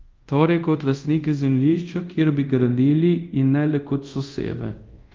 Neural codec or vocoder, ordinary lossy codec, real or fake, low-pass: codec, 24 kHz, 0.5 kbps, DualCodec; Opus, 32 kbps; fake; 7.2 kHz